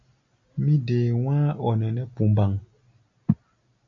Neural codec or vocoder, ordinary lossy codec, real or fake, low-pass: none; MP3, 64 kbps; real; 7.2 kHz